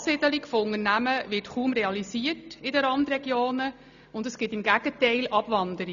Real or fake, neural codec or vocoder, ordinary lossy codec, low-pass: real; none; none; 7.2 kHz